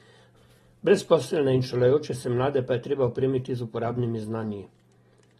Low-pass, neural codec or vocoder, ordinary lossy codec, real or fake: 19.8 kHz; none; AAC, 32 kbps; real